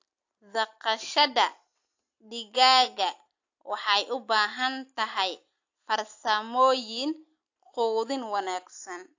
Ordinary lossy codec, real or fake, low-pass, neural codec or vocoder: AAC, 48 kbps; real; 7.2 kHz; none